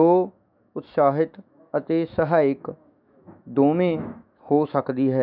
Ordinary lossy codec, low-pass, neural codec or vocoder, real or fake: none; 5.4 kHz; autoencoder, 48 kHz, 128 numbers a frame, DAC-VAE, trained on Japanese speech; fake